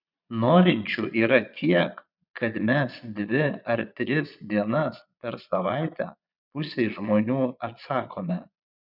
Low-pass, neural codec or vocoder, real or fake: 5.4 kHz; vocoder, 22.05 kHz, 80 mel bands, Vocos; fake